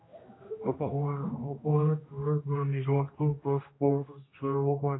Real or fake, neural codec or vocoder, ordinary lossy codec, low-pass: fake; codec, 16 kHz, 1 kbps, X-Codec, HuBERT features, trained on general audio; AAC, 16 kbps; 7.2 kHz